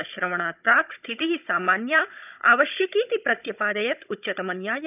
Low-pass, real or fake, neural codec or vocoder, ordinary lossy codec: 3.6 kHz; fake; codec, 16 kHz, 16 kbps, FunCodec, trained on Chinese and English, 50 frames a second; none